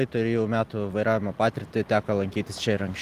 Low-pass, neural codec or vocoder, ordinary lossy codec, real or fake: 14.4 kHz; none; Opus, 24 kbps; real